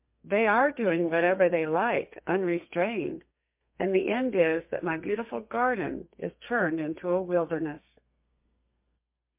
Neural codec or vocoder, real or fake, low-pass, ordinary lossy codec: codec, 32 kHz, 1.9 kbps, SNAC; fake; 3.6 kHz; MP3, 32 kbps